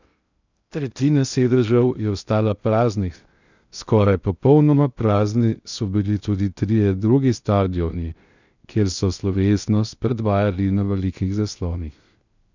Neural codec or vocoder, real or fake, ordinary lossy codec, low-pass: codec, 16 kHz in and 24 kHz out, 0.6 kbps, FocalCodec, streaming, 2048 codes; fake; none; 7.2 kHz